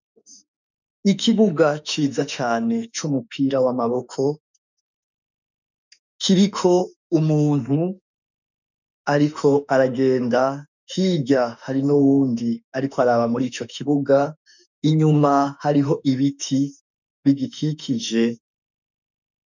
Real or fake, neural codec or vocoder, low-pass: fake; autoencoder, 48 kHz, 32 numbers a frame, DAC-VAE, trained on Japanese speech; 7.2 kHz